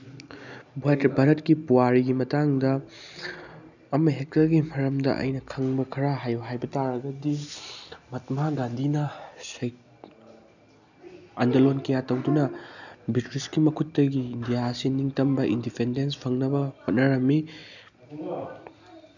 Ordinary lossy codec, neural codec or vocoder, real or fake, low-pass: none; none; real; 7.2 kHz